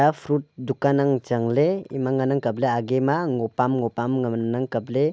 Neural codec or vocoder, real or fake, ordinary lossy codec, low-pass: none; real; none; none